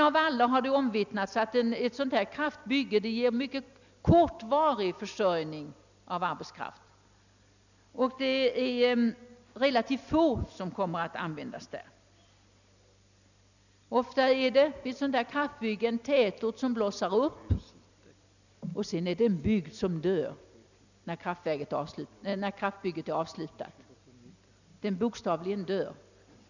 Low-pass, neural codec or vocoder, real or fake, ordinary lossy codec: 7.2 kHz; none; real; none